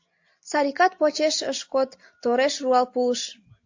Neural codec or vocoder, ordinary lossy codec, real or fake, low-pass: none; AAC, 48 kbps; real; 7.2 kHz